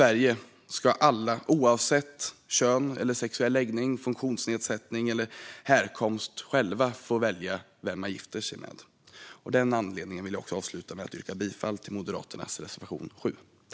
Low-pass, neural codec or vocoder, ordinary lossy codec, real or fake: none; none; none; real